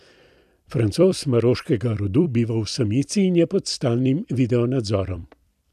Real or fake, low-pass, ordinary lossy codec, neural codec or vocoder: fake; 14.4 kHz; none; vocoder, 44.1 kHz, 128 mel bands every 256 samples, BigVGAN v2